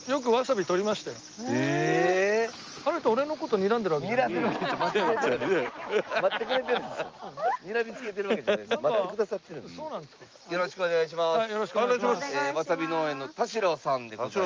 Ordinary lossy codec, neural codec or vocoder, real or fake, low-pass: Opus, 24 kbps; none; real; 7.2 kHz